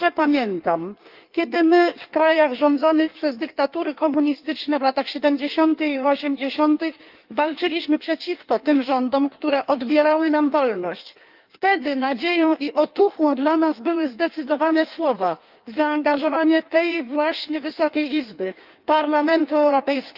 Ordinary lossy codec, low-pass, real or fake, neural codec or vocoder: Opus, 24 kbps; 5.4 kHz; fake; codec, 16 kHz in and 24 kHz out, 1.1 kbps, FireRedTTS-2 codec